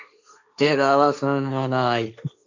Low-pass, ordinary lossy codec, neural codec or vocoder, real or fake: 7.2 kHz; AAC, 48 kbps; codec, 16 kHz, 1.1 kbps, Voila-Tokenizer; fake